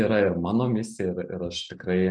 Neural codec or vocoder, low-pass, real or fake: none; 9.9 kHz; real